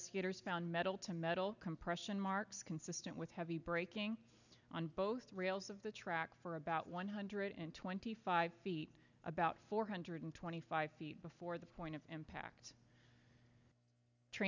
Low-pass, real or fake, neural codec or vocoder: 7.2 kHz; real; none